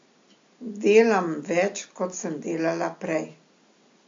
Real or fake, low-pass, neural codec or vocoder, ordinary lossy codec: real; 7.2 kHz; none; AAC, 48 kbps